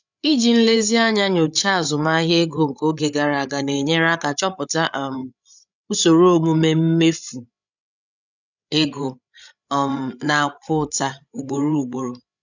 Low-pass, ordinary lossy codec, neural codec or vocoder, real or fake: 7.2 kHz; none; codec, 16 kHz, 8 kbps, FreqCodec, larger model; fake